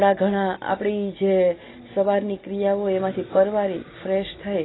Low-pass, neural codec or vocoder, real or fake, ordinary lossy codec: 7.2 kHz; none; real; AAC, 16 kbps